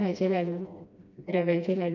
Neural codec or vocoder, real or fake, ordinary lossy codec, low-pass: codec, 16 kHz, 1 kbps, FreqCodec, smaller model; fake; none; 7.2 kHz